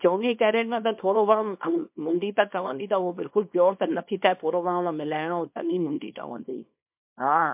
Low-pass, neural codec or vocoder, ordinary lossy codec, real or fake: 3.6 kHz; codec, 24 kHz, 0.9 kbps, WavTokenizer, small release; MP3, 32 kbps; fake